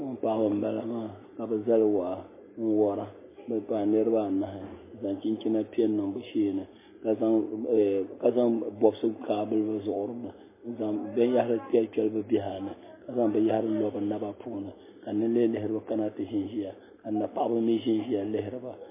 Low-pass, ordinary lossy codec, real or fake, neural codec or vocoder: 3.6 kHz; MP3, 16 kbps; real; none